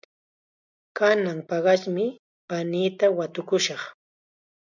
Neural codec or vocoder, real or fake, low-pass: none; real; 7.2 kHz